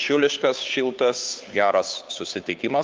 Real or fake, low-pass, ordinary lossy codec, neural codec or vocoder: fake; 7.2 kHz; Opus, 24 kbps; codec, 16 kHz, 2 kbps, FunCodec, trained on Chinese and English, 25 frames a second